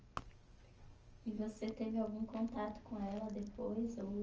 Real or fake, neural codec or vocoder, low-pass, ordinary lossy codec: real; none; 7.2 kHz; Opus, 24 kbps